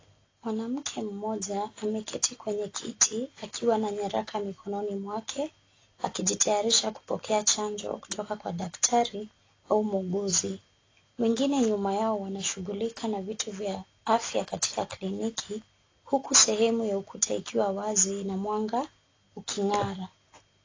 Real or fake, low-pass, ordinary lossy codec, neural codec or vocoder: real; 7.2 kHz; AAC, 32 kbps; none